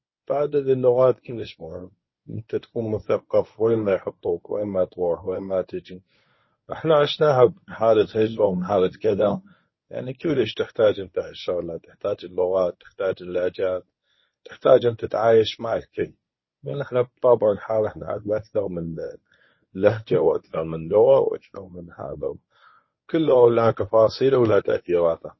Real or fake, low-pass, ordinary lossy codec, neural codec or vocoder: fake; 7.2 kHz; MP3, 24 kbps; codec, 24 kHz, 0.9 kbps, WavTokenizer, medium speech release version 1